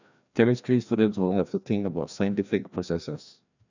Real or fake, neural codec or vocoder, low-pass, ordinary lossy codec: fake; codec, 16 kHz, 1 kbps, FreqCodec, larger model; 7.2 kHz; none